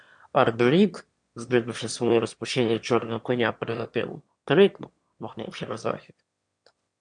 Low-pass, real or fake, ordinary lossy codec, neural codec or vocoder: 9.9 kHz; fake; MP3, 64 kbps; autoencoder, 22.05 kHz, a latent of 192 numbers a frame, VITS, trained on one speaker